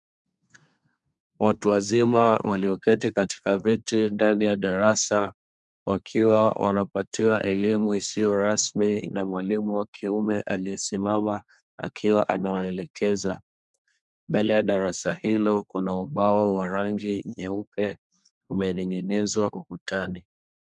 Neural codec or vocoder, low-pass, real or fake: codec, 24 kHz, 1 kbps, SNAC; 10.8 kHz; fake